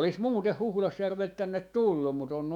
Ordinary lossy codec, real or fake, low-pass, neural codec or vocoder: none; fake; 19.8 kHz; autoencoder, 48 kHz, 128 numbers a frame, DAC-VAE, trained on Japanese speech